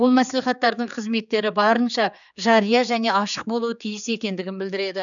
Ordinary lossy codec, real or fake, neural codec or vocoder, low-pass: none; fake; codec, 16 kHz, 4 kbps, X-Codec, HuBERT features, trained on general audio; 7.2 kHz